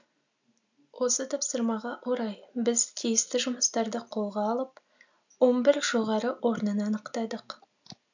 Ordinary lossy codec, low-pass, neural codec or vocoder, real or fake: none; 7.2 kHz; none; real